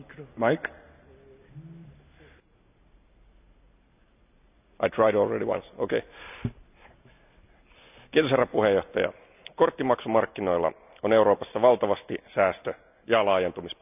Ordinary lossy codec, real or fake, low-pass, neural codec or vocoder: none; real; 3.6 kHz; none